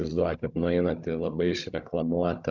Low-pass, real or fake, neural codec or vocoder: 7.2 kHz; fake; codec, 16 kHz, 16 kbps, FunCodec, trained on Chinese and English, 50 frames a second